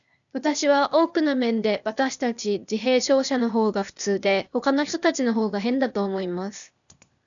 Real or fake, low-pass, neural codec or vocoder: fake; 7.2 kHz; codec, 16 kHz, 0.8 kbps, ZipCodec